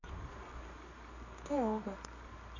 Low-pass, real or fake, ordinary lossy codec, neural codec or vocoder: 7.2 kHz; fake; none; codec, 24 kHz, 0.9 kbps, WavTokenizer, medium music audio release